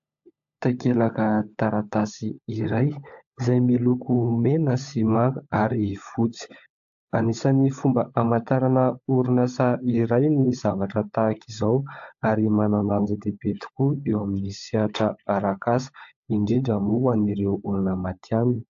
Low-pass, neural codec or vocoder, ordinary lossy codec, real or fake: 7.2 kHz; codec, 16 kHz, 16 kbps, FunCodec, trained on LibriTTS, 50 frames a second; AAC, 64 kbps; fake